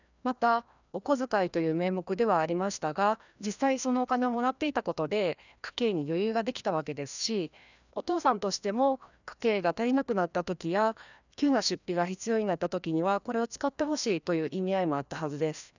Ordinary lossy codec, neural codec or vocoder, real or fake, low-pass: none; codec, 16 kHz, 1 kbps, FreqCodec, larger model; fake; 7.2 kHz